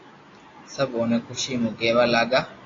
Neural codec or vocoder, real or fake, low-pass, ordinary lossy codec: none; real; 7.2 kHz; AAC, 32 kbps